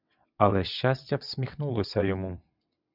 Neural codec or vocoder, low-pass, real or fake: vocoder, 22.05 kHz, 80 mel bands, WaveNeXt; 5.4 kHz; fake